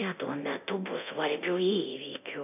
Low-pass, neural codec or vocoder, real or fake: 3.6 kHz; codec, 24 kHz, 0.9 kbps, DualCodec; fake